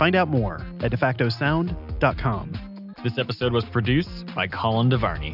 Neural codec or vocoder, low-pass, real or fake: none; 5.4 kHz; real